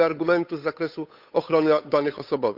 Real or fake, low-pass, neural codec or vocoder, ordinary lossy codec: fake; 5.4 kHz; codec, 16 kHz, 8 kbps, FunCodec, trained on Chinese and English, 25 frames a second; none